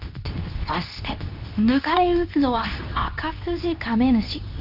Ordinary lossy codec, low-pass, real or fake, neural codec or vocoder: none; 5.4 kHz; fake; codec, 24 kHz, 0.9 kbps, WavTokenizer, medium speech release version 2